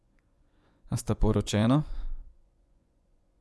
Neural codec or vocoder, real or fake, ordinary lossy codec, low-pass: vocoder, 24 kHz, 100 mel bands, Vocos; fake; none; none